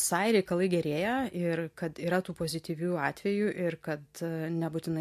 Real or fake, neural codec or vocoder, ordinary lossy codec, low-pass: real; none; MP3, 64 kbps; 14.4 kHz